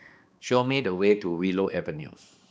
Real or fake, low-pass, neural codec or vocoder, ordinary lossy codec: fake; none; codec, 16 kHz, 2 kbps, X-Codec, HuBERT features, trained on balanced general audio; none